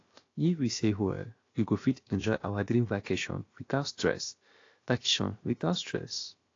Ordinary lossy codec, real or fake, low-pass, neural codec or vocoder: AAC, 32 kbps; fake; 7.2 kHz; codec, 16 kHz, about 1 kbps, DyCAST, with the encoder's durations